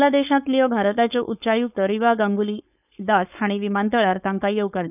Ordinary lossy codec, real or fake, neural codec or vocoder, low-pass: none; fake; codec, 16 kHz, 4.8 kbps, FACodec; 3.6 kHz